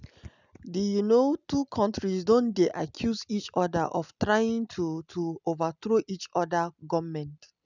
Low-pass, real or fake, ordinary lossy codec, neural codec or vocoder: 7.2 kHz; real; none; none